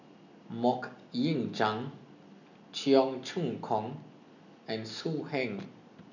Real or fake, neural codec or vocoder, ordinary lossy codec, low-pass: real; none; none; 7.2 kHz